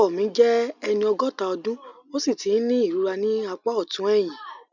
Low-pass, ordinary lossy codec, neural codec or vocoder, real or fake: 7.2 kHz; none; none; real